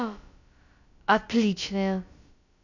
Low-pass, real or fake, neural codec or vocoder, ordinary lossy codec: 7.2 kHz; fake; codec, 16 kHz, about 1 kbps, DyCAST, with the encoder's durations; none